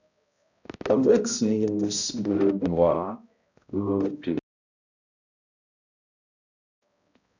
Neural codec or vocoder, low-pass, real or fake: codec, 16 kHz, 0.5 kbps, X-Codec, HuBERT features, trained on balanced general audio; 7.2 kHz; fake